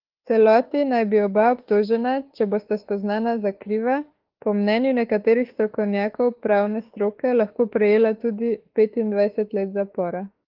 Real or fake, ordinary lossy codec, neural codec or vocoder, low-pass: fake; Opus, 16 kbps; autoencoder, 48 kHz, 32 numbers a frame, DAC-VAE, trained on Japanese speech; 5.4 kHz